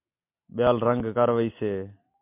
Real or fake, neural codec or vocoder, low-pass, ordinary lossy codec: real; none; 3.6 kHz; MP3, 32 kbps